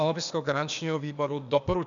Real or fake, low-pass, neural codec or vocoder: fake; 7.2 kHz; codec, 16 kHz, 0.8 kbps, ZipCodec